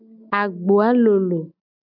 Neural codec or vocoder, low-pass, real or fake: none; 5.4 kHz; real